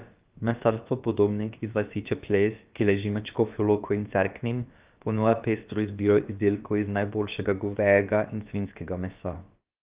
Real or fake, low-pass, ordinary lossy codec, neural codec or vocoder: fake; 3.6 kHz; Opus, 32 kbps; codec, 16 kHz, about 1 kbps, DyCAST, with the encoder's durations